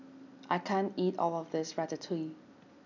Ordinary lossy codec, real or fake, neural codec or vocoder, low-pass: none; real; none; 7.2 kHz